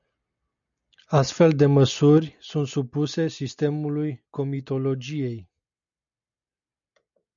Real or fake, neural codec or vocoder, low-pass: real; none; 7.2 kHz